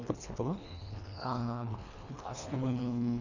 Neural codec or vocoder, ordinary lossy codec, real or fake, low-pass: codec, 24 kHz, 1.5 kbps, HILCodec; none; fake; 7.2 kHz